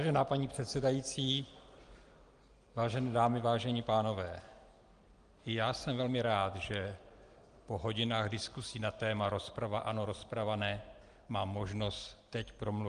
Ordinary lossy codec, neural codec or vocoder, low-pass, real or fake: Opus, 24 kbps; none; 9.9 kHz; real